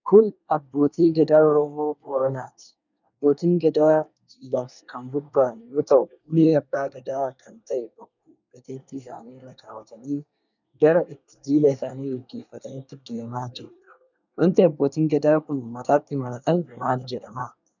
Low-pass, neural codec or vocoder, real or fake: 7.2 kHz; codec, 24 kHz, 1 kbps, SNAC; fake